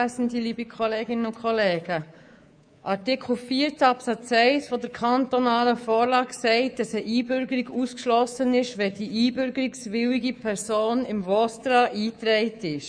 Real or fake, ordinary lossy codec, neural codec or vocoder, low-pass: fake; none; codec, 24 kHz, 3.1 kbps, DualCodec; 9.9 kHz